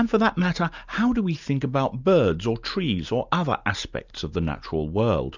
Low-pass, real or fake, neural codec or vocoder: 7.2 kHz; real; none